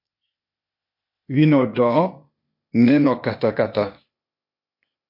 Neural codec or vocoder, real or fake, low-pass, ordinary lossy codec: codec, 16 kHz, 0.8 kbps, ZipCodec; fake; 5.4 kHz; MP3, 32 kbps